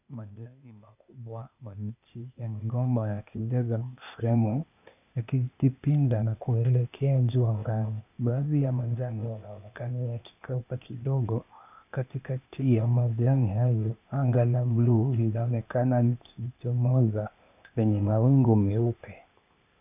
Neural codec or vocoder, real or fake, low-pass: codec, 16 kHz, 0.8 kbps, ZipCodec; fake; 3.6 kHz